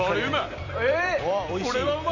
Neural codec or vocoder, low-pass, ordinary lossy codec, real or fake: vocoder, 44.1 kHz, 128 mel bands every 256 samples, BigVGAN v2; 7.2 kHz; none; fake